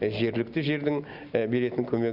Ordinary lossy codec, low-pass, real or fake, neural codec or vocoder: none; 5.4 kHz; real; none